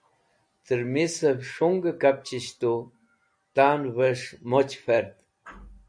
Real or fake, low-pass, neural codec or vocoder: real; 9.9 kHz; none